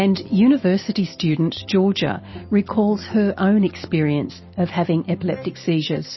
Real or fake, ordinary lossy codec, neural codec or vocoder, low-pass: real; MP3, 24 kbps; none; 7.2 kHz